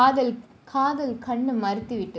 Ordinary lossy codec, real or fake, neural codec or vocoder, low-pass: none; real; none; none